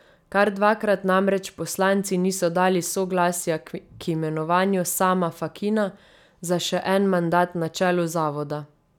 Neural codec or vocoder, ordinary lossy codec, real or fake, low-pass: none; none; real; 19.8 kHz